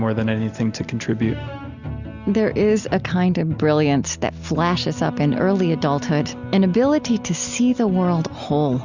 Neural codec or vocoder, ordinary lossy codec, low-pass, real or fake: none; Opus, 64 kbps; 7.2 kHz; real